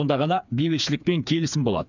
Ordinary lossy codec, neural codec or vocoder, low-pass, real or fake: none; codec, 16 kHz, 4 kbps, FreqCodec, smaller model; 7.2 kHz; fake